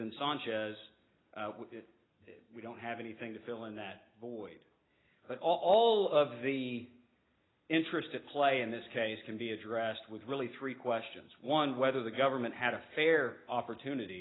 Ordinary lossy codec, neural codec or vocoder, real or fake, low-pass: AAC, 16 kbps; none; real; 7.2 kHz